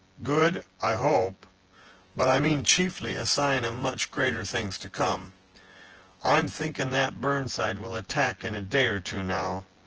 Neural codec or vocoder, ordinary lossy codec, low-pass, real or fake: vocoder, 24 kHz, 100 mel bands, Vocos; Opus, 16 kbps; 7.2 kHz; fake